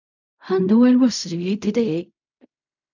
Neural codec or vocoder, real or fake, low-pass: codec, 16 kHz in and 24 kHz out, 0.4 kbps, LongCat-Audio-Codec, fine tuned four codebook decoder; fake; 7.2 kHz